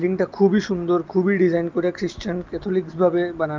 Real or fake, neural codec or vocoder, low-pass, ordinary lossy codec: real; none; 7.2 kHz; Opus, 32 kbps